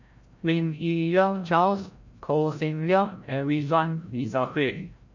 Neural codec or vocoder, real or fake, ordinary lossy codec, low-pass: codec, 16 kHz, 0.5 kbps, FreqCodec, larger model; fake; MP3, 64 kbps; 7.2 kHz